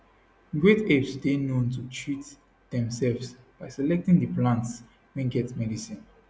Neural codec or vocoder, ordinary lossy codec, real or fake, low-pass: none; none; real; none